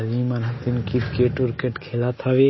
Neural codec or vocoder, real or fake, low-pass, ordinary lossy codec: none; real; 7.2 kHz; MP3, 24 kbps